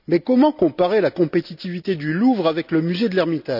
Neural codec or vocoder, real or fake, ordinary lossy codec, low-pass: none; real; none; 5.4 kHz